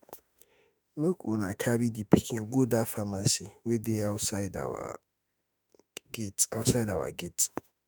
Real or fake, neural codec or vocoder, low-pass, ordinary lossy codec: fake; autoencoder, 48 kHz, 32 numbers a frame, DAC-VAE, trained on Japanese speech; none; none